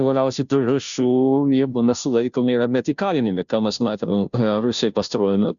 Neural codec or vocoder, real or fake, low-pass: codec, 16 kHz, 0.5 kbps, FunCodec, trained on Chinese and English, 25 frames a second; fake; 7.2 kHz